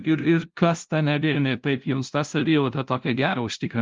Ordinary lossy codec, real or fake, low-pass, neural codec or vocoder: Opus, 32 kbps; fake; 7.2 kHz; codec, 16 kHz, 0.5 kbps, FunCodec, trained on LibriTTS, 25 frames a second